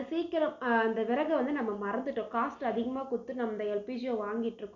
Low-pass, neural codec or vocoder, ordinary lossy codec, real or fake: 7.2 kHz; none; none; real